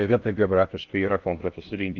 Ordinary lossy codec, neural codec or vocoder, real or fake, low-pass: Opus, 32 kbps; codec, 16 kHz in and 24 kHz out, 0.6 kbps, FocalCodec, streaming, 4096 codes; fake; 7.2 kHz